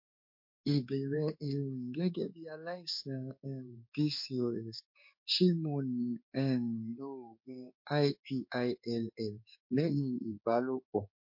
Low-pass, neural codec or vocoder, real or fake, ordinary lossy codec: 5.4 kHz; codec, 16 kHz in and 24 kHz out, 1 kbps, XY-Tokenizer; fake; MP3, 32 kbps